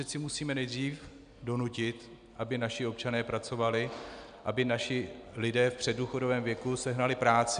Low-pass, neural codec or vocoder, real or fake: 9.9 kHz; none; real